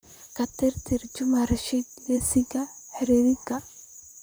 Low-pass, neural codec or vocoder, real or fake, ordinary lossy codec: none; vocoder, 44.1 kHz, 128 mel bands, Pupu-Vocoder; fake; none